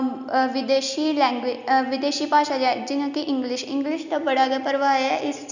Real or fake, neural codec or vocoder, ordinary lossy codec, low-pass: real; none; none; 7.2 kHz